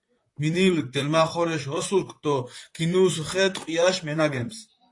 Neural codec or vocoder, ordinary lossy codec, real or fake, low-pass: vocoder, 44.1 kHz, 128 mel bands, Pupu-Vocoder; AAC, 48 kbps; fake; 10.8 kHz